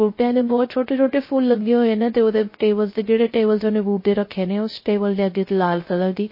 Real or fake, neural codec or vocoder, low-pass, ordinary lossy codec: fake; codec, 16 kHz, 0.3 kbps, FocalCodec; 5.4 kHz; MP3, 24 kbps